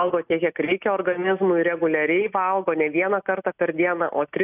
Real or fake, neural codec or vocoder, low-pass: real; none; 3.6 kHz